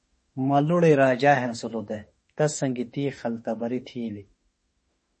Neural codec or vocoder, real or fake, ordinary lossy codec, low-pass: autoencoder, 48 kHz, 32 numbers a frame, DAC-VAE, trained on Japanese speech; fake; MP3, 32 kbps; 10.8 kHz